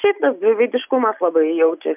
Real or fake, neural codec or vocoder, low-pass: real; none; 3.6 kHz